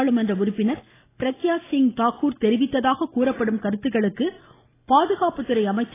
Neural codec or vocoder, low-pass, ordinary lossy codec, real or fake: none; 3.6 kHz; AAC, 16 kbps; real